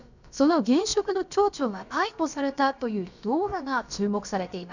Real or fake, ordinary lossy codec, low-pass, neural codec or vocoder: fake; none; 7.2 kHz; codec, 16 kHz, about 1 kbps, DyCAST, with the encoder's durations